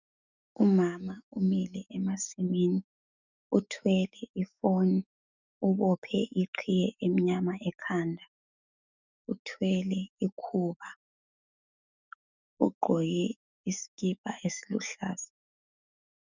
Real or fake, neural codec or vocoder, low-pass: fake; autoencoder, 48 kHz, 128 numbers a frame, DAC-VAE, trained on Japanese speech; 7.2 kHz